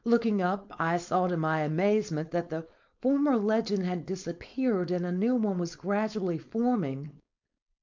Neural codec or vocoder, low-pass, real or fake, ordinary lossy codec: codec, 16 kHz, 4.8 kbps, FACodec; 7.2 kHz; fake; MP3, 64 kbps